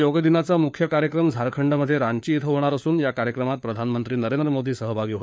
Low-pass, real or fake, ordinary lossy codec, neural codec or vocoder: none; fake; none; codec, 16 kHz, 4 kbps, FunCodec, trained on LibriTTS, 50 frames a second